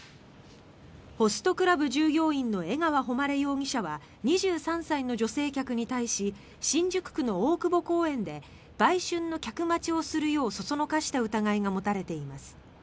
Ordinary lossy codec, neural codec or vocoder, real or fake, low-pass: none; none; real; none